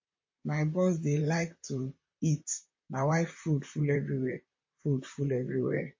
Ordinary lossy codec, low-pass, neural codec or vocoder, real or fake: MP3, 32 kbps; 7.2 kHz; vocoder, 44.1 kHz, 128 mel bands, Pupu-Vocoder; fake